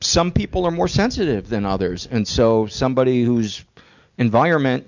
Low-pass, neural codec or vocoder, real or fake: 7.2 kHz; none; real